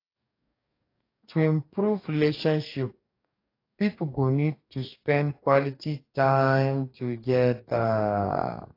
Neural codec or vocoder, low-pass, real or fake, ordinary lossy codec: codec, 44.1 kHz, 2.6 kbps, DAC; 5.4 kHz; fake; AAC, 32 kbps